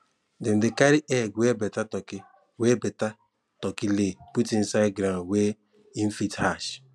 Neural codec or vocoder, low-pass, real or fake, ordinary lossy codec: none; none; real; none